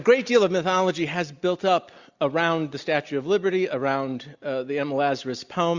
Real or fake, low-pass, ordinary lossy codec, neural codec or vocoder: real; 7.2 kHz; Opus, 64 kbps; none